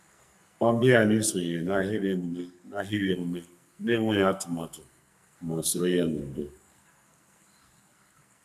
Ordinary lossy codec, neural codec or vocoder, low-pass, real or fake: none; codec, 44.1 kHz, 2.6 kbps, SNAC; 14.4 kHz; fake